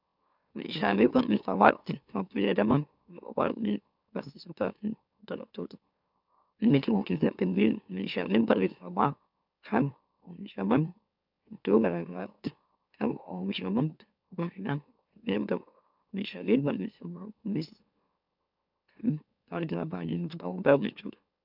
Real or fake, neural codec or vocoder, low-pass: fake; autoencoder, 44.1 kHz, a latent of 192 numbers a frame, MeloTTS; 5.4 kHz